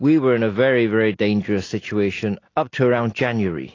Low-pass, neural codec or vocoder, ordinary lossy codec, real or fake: 7.2 kHz; none; AAC, 32 kbps; real